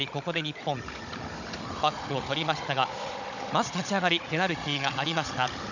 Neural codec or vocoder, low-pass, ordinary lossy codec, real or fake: codec, 16 kHz, 16 kbps, FunCodec, trained on Chinese and English, 50 frames a second; 7.2 kHz; none; fake